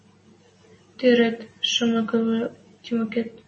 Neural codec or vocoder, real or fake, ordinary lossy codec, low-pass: none; real; MP3, 32 kbps; 10.8 kHz